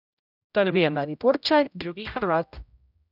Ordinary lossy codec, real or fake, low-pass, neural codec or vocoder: none; fake; 5.4 kHz; codec, 16 kHz, 0.5 kbps, X-Codec, HuBERT features, trained on general audio